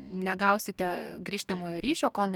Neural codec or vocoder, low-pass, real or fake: codec, 44.1 kHz, 2.6 kbps, DAC; 19.8 kHz; fake